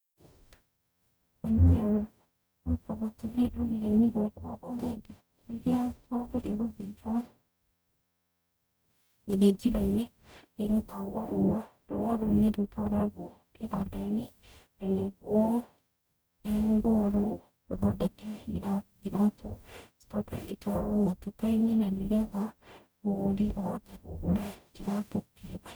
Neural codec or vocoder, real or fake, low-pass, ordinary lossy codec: codec, 44.1 kHz, 0.9 kbps, DAC; fake; none; none